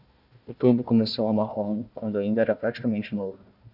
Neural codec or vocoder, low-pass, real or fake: codec, 16 kHz, 1 kbps, FunCodec, trained on Chinese and English, 50 frames a second; 5.4 kHz; fake